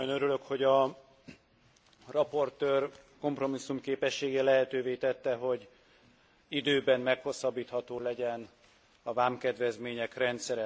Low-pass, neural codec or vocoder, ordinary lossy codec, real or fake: none; none; none; real